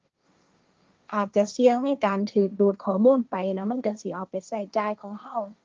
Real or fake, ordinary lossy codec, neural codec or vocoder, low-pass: fake; Opus, 24 kbps; codec, 16 kHz, 1.1 kbps, Voila-Tokenizer; 7.2 kHz